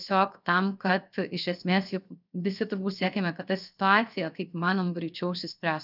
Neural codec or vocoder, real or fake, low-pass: codec, 16 kHz, 0.7 kbps, FocalCodec; fake; 5.4 kHz